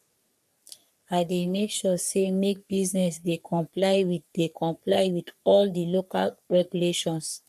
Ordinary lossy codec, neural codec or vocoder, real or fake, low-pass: none; codec, 44.1 kHz, 3.4 kbps, Pupu-Codec; fake; 14.4 kHz